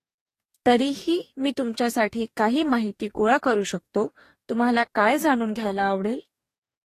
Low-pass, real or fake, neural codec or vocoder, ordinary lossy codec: 14.4 kHz; fake; codec, 44.1 kHz, 2.6 kbps, DAC; AAC, 48 kbps